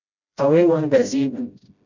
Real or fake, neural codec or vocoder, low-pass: fake; codec, 16 kHz, 0.5 kbps, FreqCodec, smaller model; 7.2 kHz